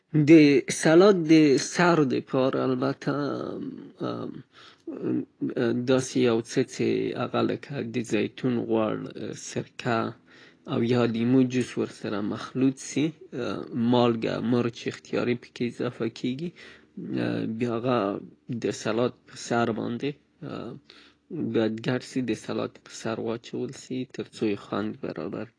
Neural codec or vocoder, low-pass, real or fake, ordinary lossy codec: none; 9.9 kHz; real; AAC, 32 kbps